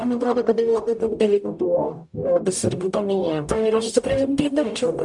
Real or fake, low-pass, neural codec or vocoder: fake; 10.8 kHz; codec, 44.1 kHz, 0.9 kbps, DAC